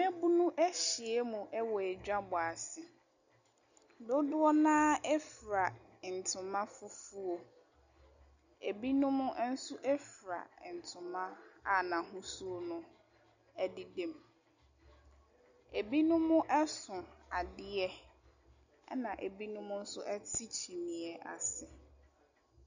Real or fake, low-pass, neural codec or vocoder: real; 7.2 kHz; none